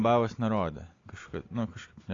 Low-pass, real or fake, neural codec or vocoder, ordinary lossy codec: 7.2 kHz; real; none; AAC, 32 kbps